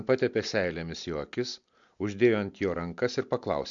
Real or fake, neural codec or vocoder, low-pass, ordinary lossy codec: real; none; 7.2 kHz; MP3, 64 kbps